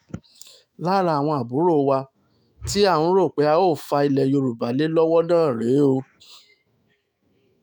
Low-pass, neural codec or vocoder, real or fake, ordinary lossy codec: none; autoencoder, 48 kHz, 128 numbers a frame, DAC-VAE, trained on Japanese speech; fake; none